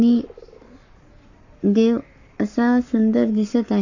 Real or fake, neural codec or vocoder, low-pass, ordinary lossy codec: fake; codec, 44.1 kHz, 7.8 kbps, Pupu-Codec; 7.2 kHz; none